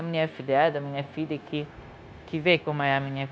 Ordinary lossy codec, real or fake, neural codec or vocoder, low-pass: none; fake; codec, 16 kHz, 0.9 kbps, LongCat-Audio-Codec; none